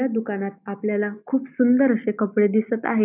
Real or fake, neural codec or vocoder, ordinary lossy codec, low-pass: real; none; none; 3.6 kHz